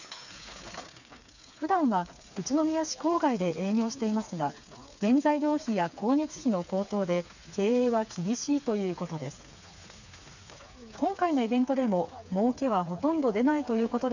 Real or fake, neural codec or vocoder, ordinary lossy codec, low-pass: fake; codec, 16 kHz, 4 kbps, FreqCodec, smaller model; none; 7.2 kHz